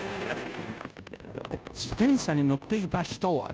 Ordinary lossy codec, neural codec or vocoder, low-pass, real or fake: none; codec, 16 kHz, 0.5 kbps, FunCodec, trained on Chinese and English, 25 frames a second; none; fake